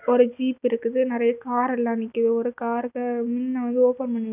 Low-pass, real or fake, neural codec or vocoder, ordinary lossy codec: 3.6 kHz; real; none; none